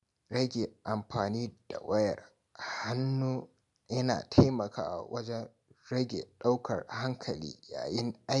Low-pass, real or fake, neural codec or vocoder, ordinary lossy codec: 10.8 kHz; real; none; MP3, 96 kbps